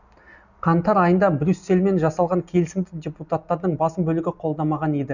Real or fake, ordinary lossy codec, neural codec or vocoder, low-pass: real; none; none; 7.2 kHz